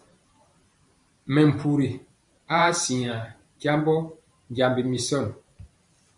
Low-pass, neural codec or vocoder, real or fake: 10.8 kHz; vocoder, 44.1 kHz, 128 mel bands every 512 samples, BigVGAN v2; fake